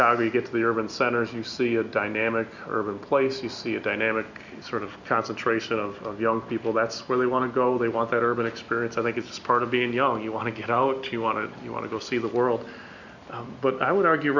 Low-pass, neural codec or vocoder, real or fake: 7.2 kHz; none; real